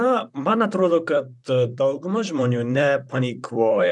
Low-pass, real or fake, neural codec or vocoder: 10.8 kHz; fake; vocoder, 44.1 kHz, 128 mel bands, Pupu-Vocoder